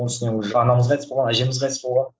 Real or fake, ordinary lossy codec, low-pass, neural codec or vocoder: real; none; none; none